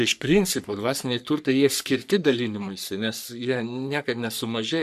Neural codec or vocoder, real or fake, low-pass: codec, 44.1 kHz, 3.4 kbps, Pupu-Codec; fake; 14.4 kHz